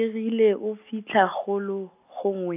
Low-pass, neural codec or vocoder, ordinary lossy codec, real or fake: 3.6 kHz; none; AAC, 24 kbps; real